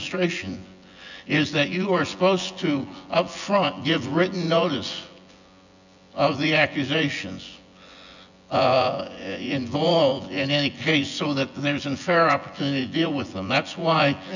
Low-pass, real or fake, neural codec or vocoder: 7.2 kHz; fake; vocoder, 24 kHz, 100 mel bands, Vocos